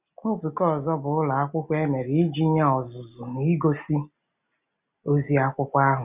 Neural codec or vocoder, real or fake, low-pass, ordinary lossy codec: none; real; 3.6 kHz; none